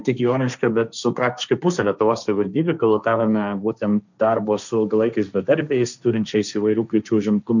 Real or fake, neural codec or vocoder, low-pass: fake; codec, 16 kHz, 1.1 kbps, Voila-Tokenizer; 7.2 kHz